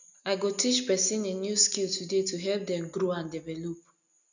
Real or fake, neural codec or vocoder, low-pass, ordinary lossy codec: real; none; 7.2 kHz; none